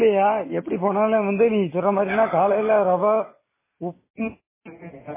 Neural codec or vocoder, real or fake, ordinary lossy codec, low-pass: none; real; MP3, 16 kbps; 3.6 kHz